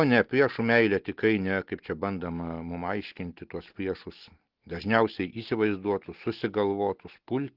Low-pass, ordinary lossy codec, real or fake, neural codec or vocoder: 5.4 kHz; Opus, 16 kbps; real; none